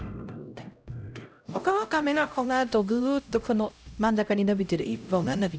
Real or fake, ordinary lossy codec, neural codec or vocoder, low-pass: fake; none; codec, 16 kHz, 0.5 kbps, X-Codec, HuBERT features, trained on LibriSpeech; none